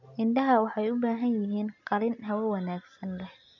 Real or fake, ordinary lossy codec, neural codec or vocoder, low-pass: real; none; none; 7.2 kHz